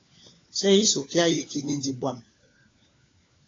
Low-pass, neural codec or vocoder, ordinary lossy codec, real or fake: 7.2 kHz; codec, 16 kHz, 4 kbps, FunCodec, trained on LibriTTS, 50 frames a second; AAC, 32 kbps; fake